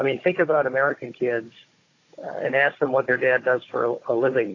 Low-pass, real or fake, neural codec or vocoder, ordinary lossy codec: 7.2 kHz; fake; codec, 16 kHz, 16 kbps, FunCodec, trained on Chinese and English, 50 frames a second; AAC, 32 kbps